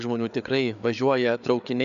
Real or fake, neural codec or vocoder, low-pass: fake; codec, 16 kHz, 4 kbps, FreqCodec, larger model; 7.2 kHz